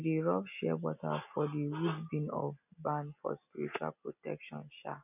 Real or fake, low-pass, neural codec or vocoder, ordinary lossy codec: real; 3.6 kHz; none; none